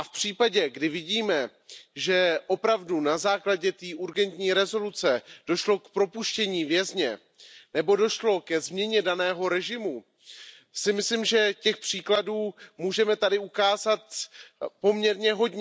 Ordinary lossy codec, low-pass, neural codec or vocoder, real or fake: none; none; none; real